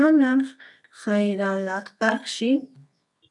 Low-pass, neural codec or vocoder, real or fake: 10.8 kHz; codec, 24 kHz, 0.9 kbps, WavTokenizer, medium music audio release; fake